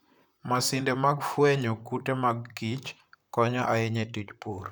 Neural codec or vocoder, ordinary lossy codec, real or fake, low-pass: vocoder, 44.1 kHz, 128 mel bands, Pupu-Vocoder; none; fake; none